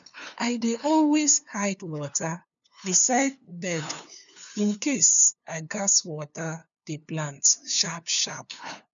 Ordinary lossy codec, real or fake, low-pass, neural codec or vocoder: none; fake; 7.2 kHz; codec, 16 kHz, 2 kbps, FunCodec, trained on LibriTTS, 25 frames a second